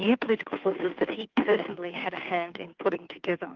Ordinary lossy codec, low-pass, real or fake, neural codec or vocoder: Opus, 32 kbps; 7.2 kHz; fake; codec, 16 kHz, 2 kbps, FunCodec, trained on Chinese and English, 25 frames a second